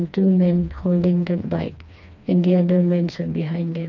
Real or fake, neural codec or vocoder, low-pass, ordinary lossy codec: fake; codec, 16 kHz, 2 kbps, FreqCodec, smaller model; 7.2 kHz; none